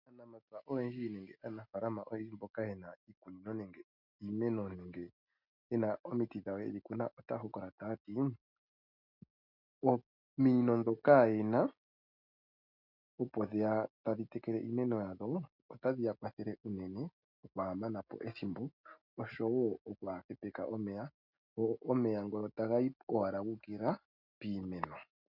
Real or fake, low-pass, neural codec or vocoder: real; 5.4 kHz; none